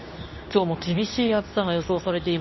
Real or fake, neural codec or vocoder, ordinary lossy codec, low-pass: fake; codec, 24 kHz, 0.9 kbps, WavTokenizer, medium speech release version 2; MP3, 24 kbps; 7.2 kHz